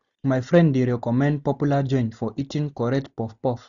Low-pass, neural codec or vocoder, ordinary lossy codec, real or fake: 7.2 kHz; none; none; real